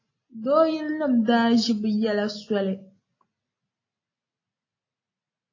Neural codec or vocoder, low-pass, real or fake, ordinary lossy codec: none; 7.2 kHz; real; AAC, 32 kbps